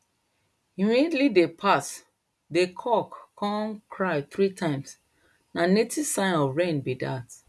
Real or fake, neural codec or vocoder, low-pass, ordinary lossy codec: real; none; none; none